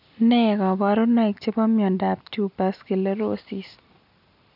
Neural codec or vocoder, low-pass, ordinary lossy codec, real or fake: none; 5.4 kHz; none; real